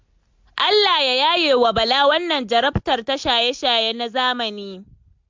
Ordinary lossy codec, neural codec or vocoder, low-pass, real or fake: MP3, 64 kbps; none; 7.2 kHz; real